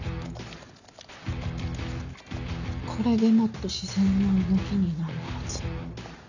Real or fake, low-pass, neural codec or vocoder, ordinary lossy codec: real; 7.2 kHz; none; none